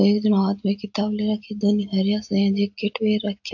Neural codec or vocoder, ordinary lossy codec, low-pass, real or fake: none; AAC, 48 kbps; 7.2 kHz; real